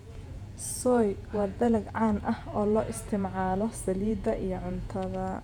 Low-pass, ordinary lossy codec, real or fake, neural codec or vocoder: 19.8 kHz; none; fake; vocoder, 48 kHz, 128 mel bands, Vocos